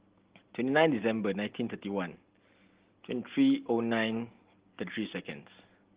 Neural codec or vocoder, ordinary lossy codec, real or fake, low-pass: none; Opus, 16 kbps; real; 3.6 kHz